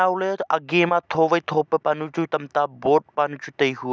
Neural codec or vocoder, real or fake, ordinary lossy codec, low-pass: none; real; none; none